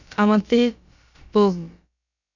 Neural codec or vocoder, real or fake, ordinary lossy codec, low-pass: codec, 16 kHz, about 1 kbps, DyCAST, with the encoder's durations; fake; none; 7.2 kHz